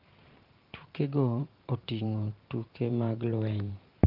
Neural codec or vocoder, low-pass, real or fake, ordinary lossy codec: vocoder, 44.1 kHz, 128 mel bands every 512 samples, BigVGAN v2; 5.4 kHz; fake; Opus, 24 kbps